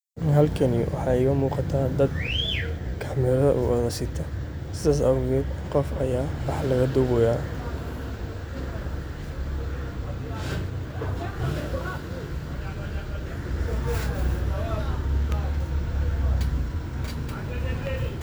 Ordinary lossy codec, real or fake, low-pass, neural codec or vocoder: none; real; none; none